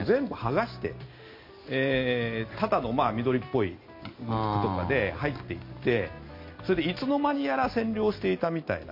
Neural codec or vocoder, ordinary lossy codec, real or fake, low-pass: none; MP3, 32 kbps; real; 5.4 kHz